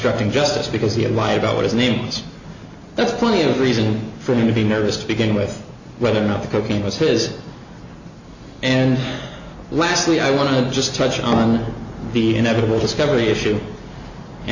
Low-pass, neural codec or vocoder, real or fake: 7.2 kHz; none; real